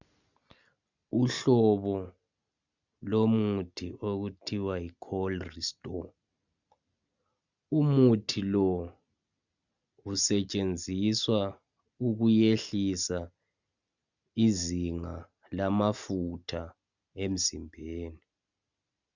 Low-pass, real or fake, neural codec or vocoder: 7.2 kHz; real; none